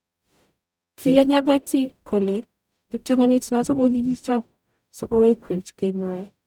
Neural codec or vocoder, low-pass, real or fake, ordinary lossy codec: codec, 44.1 kHz, 0.9 kbps, DAC; 19.8 kHz; fake; none